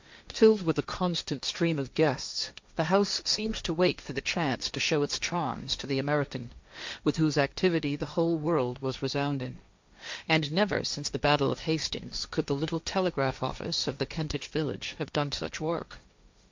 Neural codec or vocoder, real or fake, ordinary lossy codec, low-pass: codec, 16 kHz, 1.1 kbps, Voila-Tokenizer; fake; MP3, 64 kbps; 7.2 kHz